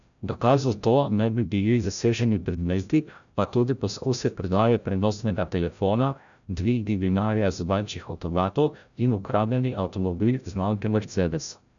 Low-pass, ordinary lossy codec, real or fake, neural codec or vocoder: 7.2 kHz; none; fake; codec, 16 kHz, 0.5 kbps, FreqCodec, larger model